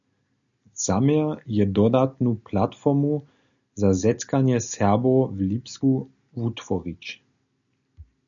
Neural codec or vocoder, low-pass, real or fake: none; 7.2 kHz; real